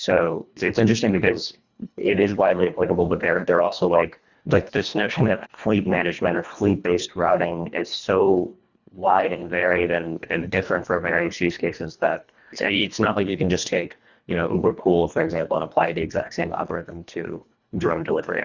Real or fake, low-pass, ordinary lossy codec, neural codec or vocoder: fake; 7.2 kHz; Opus, 64 kbps; codec, 24 kHz, 1.5 kbps, HILCodec